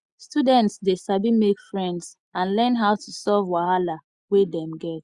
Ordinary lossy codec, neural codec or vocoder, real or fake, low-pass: Opus, 64 kbps; none; real; 10.8 kHz